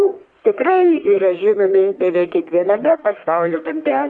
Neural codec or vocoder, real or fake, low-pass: codec, 44.1 kHz, 1.7 kbps, Pupu-Codec; fake; 9.9 kHz